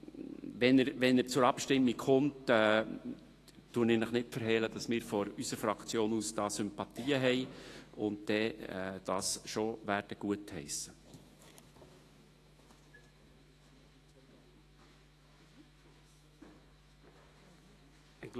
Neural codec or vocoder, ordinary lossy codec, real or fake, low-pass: autoencoder, 48 kHz, 128 numbers a frame, DAC-VAE, trained on Japanese speech; AAC, 48 kbps; fake; 14.4 kHz